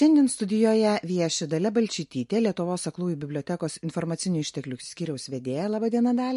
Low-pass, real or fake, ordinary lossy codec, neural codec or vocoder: 14.4 kHz; real; MP3, 48 kbps; none